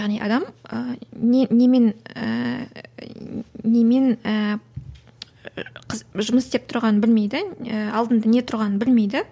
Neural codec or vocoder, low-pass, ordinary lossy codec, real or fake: none; none; none; real